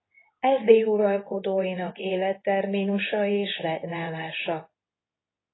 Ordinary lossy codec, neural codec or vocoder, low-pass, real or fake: AAC, 16 kbps; codec, 24 kHz, 0.9 kbps, WavTokenizer, medium speech release version 2; 7.2 kHz; fake